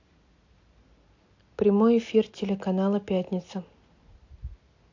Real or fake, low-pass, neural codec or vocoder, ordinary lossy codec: real; 7.2 kHz; none; AAC, 48 kbps